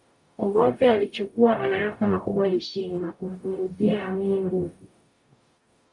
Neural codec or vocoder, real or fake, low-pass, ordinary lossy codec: codec, 44.1 kHz, 0.9 kbps, DAC; fake; 10.8 kHz; MP3, 64 kbps